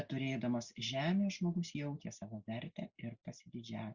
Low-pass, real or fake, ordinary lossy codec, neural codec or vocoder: 7.2 kHz; real; Opus, 64 kbps; none